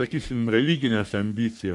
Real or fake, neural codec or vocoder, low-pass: fake; codec, 44.1 kHz, 3.4 kbps, Pupu-Codec; 10.8 kHz